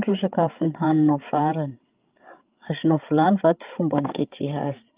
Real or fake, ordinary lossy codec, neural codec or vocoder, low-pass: fake; Opus, 32 kbps; codec, 16 kHz, 8 kbps, FreqCodec, larger model; 3.6 kHz